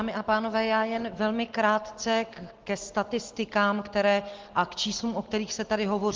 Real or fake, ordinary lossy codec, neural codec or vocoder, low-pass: real; Opus, 16 kbps; none; 7.2 kHz